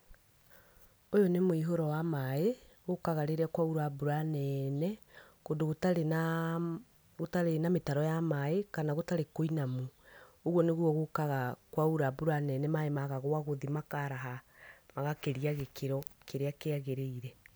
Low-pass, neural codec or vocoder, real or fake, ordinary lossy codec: none; none; real; none